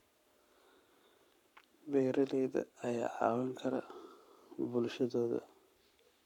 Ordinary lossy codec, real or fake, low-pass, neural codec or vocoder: none; fake; 19.8 kHz; codec, 44.1 kHz, 7.8 kbps, Pupu-Codec